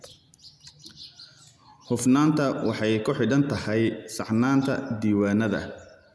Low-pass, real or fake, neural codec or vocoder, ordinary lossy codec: none; real; none; none